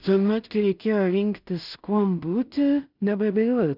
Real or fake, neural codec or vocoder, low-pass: fake; codec, 16 kHz in and 24 kHz out, 0.4 kbps, LongCat-Audio-Codec, two codebook decoder; 5.4 kHz